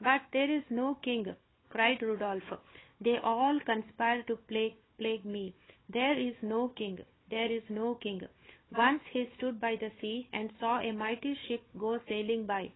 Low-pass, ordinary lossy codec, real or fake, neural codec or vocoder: 7.2 kHz; AAC, 16 kbps; fake; codec, 16 kHz, 4 kbps, FunCodec, trained on Chinese and English, 50 frames a second